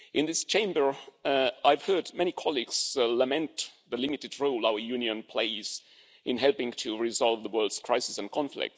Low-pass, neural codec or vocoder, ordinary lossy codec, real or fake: none; none; none; real